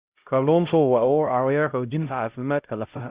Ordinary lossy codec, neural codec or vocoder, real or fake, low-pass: Opus, 64 kbps; codec, 16 kHz, 0.5 kbps, X-Codec, HuBERT features, trained on LibriSpeech; fake; 3.6 kHz